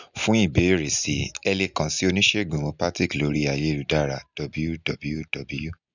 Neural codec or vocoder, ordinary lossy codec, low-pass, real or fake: none; none; 7.2 kHz; real